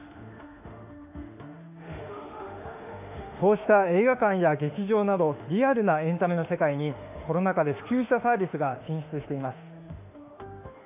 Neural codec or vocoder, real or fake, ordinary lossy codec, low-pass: autoencoder, 48 kHz, 32 numbers a frame, DAC-VAE, trained on Japanese speech; fake; none; 3.6 kHz